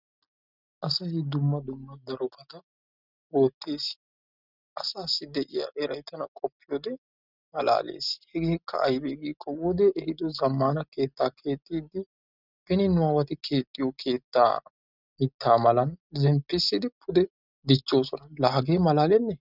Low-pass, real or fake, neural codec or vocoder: 5.4 kHz; real; none